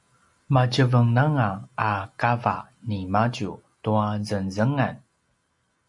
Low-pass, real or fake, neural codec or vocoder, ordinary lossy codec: 10.8 kHz; real; none; MP3, 64 kbps